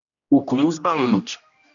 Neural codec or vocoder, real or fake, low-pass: codec, 16 kHz, 1 kbps, X-Codec, HuBERT features, trained on general audio; fake; 7.2 kHz